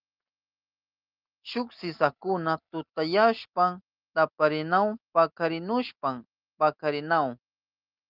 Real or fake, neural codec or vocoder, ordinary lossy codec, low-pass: real; none; Opus, 24 kbps; 5.4 kHz